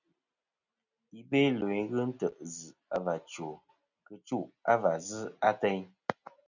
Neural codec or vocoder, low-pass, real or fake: none; 7.2 kHz; real